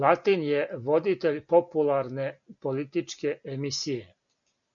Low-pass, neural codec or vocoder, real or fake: 7.2 kHz; none; real